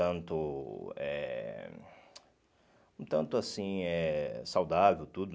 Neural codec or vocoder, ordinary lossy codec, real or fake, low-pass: none; none; real; none